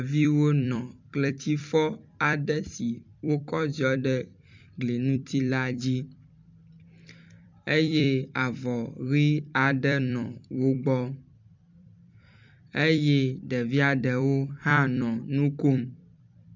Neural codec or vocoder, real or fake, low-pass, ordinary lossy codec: vocoder, 44.1 kHz, 128 mel bands every 256 samples, BigVGAN v2; fake; 7.2 kHz; MP3, 64 kbps